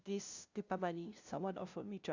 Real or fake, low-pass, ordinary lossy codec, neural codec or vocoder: fake; 7.2 kHz; Opus, 64 kbps; codec, 16 kHz, 0.5 kbps, FunCodec, trained on LibriTTS, 25 frames a second